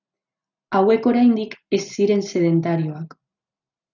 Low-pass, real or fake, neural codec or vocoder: 7.2 kHz; real; none